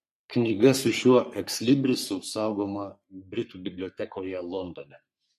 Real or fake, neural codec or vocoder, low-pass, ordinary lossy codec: fake; codec, 44.1 kHz, 3.4 kbps, Pupu-Codec; 14.4 kHz; MP3, 64 kbps